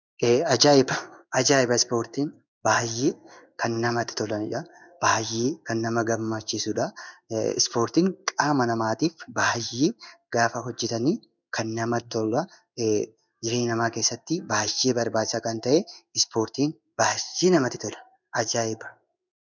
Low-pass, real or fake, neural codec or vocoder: 7.2 kHz; fake; codec, 16 kHz in and 24 kHz out, 1 kbps, XY-Tokenizer